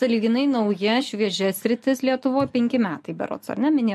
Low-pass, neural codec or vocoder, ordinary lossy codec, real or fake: 14.4 kHz; none; MP3, 64 kbps; real